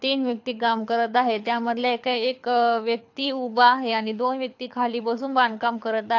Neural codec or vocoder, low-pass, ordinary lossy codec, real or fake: codec, 24 kHz, 6 kbps, HILCodec; 7.2 kHz; AAC, 48 kbps; fake